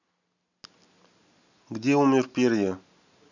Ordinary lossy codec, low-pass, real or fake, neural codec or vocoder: none; 7.2 kHz; real; none